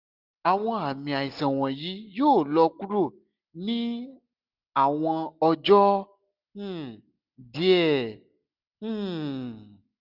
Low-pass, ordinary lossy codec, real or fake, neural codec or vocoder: 5.4 kHz; none; real; none